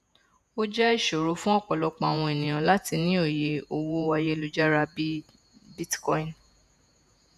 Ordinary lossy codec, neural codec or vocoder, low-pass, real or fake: none; vocoder, 48 kHz, 128 mel bands, Vocos; 14.4 kHz; fake